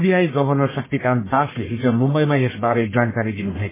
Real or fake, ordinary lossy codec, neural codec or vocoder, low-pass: fake; MP3, 16 kbps; codec, 44.1 kHz, 1.7 kbps, Pupu-Codec; 3.6 kHz